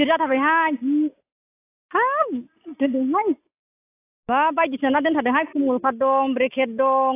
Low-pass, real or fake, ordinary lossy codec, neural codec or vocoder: 3.6 kHz; real; AAC, 24 kbps; none